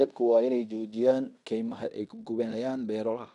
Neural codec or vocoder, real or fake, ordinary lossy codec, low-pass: codec, 16 kHz in and 24 kHz out, 0.9 kbps, LongCat-Audio-Codec, fine tuned four codebook decoder; fake; none; 10.8 kHz